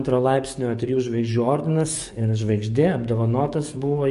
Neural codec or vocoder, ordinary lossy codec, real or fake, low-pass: codec, 44.1 kHz, 7.8 kbps, DAC; MP3, 48 kbps; fake; 14.4 kHz